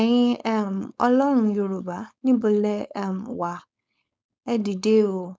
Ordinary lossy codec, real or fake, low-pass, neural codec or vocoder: none; fake; none; codec, 16 kHz, 4.8 kbps, FACodec